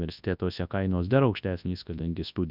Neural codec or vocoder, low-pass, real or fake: codec, 24 kHz, 0.9 kbps, WavTokenizer, large speech release; 5.4 kHz; fake